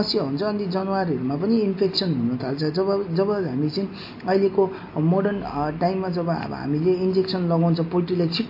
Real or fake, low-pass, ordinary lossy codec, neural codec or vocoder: real; 5.4 kHz; MP3, 32 kbps; none